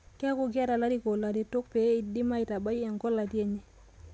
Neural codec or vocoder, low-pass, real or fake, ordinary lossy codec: none; none; real; none